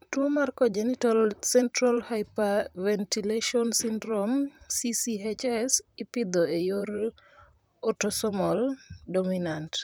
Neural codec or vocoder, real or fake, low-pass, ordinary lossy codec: vocoder, 44.1 kHz, 128 mel bands, Pupu-Vocoder; fake; none; none